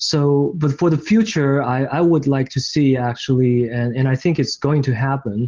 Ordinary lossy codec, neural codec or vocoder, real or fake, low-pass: Opus, 16 kbps; none; real; 7.2 kHz